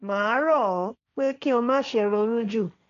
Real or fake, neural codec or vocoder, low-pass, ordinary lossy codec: fake; codec, 16 kHz, 1.1 kbps, Voila-Tokenizer; 7.2 kHz; none